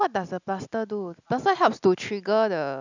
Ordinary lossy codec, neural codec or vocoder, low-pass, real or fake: none; none; 7.2 kHz; real